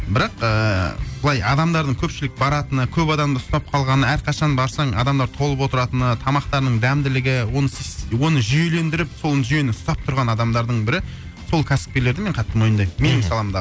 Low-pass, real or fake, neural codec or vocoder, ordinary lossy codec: none; real; none; none